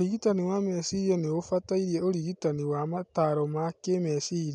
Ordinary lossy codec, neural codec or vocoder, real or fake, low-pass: none; none; real; 10.8 kHz